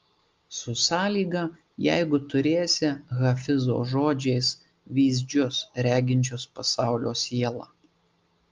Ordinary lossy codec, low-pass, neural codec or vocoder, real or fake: Opus, 32 kbps; 7.2 kHz; none; real